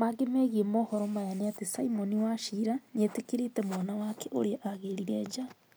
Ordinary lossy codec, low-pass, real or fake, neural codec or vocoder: none; none; real; none